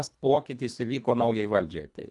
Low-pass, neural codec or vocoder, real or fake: 10.8 kHz; codec, 24 kHz, 1.5 kbps, HILCodec; fake